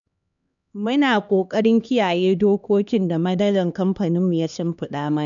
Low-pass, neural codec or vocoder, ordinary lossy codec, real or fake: 7.2 kHz; codec, 16 kHz, 2 kbps, X-Codec, HuBERT features, trained on LibriSpeech; none; fake